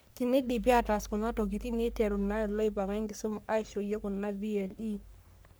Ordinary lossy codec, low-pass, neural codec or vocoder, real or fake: none; none; codec, 44.1 kHz, 3.4 kbps, Pupu-Codec; fake